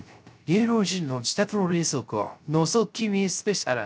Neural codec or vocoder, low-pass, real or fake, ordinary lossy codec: codec, 16 kHz, 0.3 kbps, FocalCodec; none; fake; none